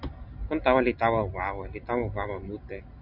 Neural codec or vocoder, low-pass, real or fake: none; 5.4 kHz; real